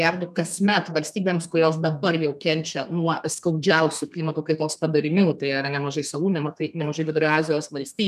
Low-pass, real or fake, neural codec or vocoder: 14.4 kHz; fake; codec, 32 kHz, 1.9 kbps, SNAC